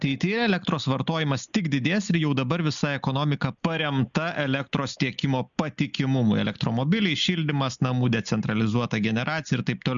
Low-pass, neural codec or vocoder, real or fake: 7.2 kHz; none; real